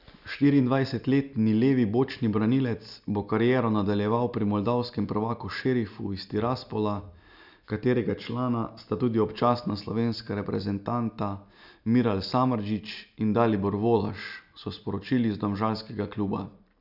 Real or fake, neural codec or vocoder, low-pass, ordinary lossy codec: real; none; 5.4 kHz; none